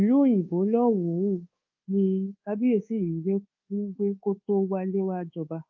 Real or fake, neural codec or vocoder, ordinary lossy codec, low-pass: fake; codec, 24 kHz, 1.2 kbps, DualCodec; Opus, 24 kbps; 7.2 kHz